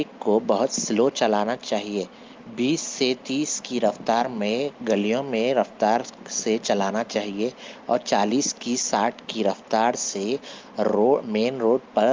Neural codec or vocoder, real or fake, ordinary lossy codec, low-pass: none; real; Opus, 32 kbps; 7.2 kHz